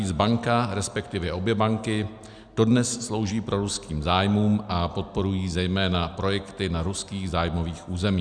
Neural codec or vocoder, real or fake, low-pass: none; real; 9.9 kHz